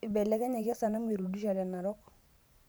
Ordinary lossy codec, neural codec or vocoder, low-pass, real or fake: none; vocoder, 44.1 kHz, 128 mel bands every 512 samples, BigVGAN v2; none; fake